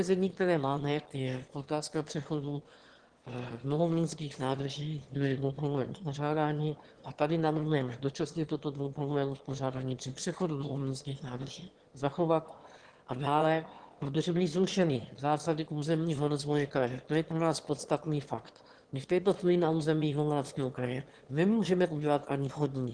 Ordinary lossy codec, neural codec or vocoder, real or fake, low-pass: Opus, 16 kbps; autoencoder, 22.05 kHz, a latent of 192 numbers a frame, VITS, trained on one speaker; fake; 9.9 kHz